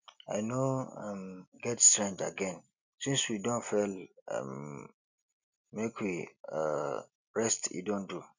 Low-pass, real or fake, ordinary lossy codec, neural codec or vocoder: 7.2 kHz; real; none; none